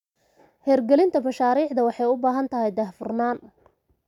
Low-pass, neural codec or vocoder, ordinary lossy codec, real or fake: 19.8 kHz; none; none; real